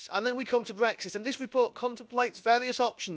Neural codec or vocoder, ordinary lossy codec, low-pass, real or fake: codec, 16 kHz, about 1 kbps, DyCAST, with the encoder's durations; none; none; fake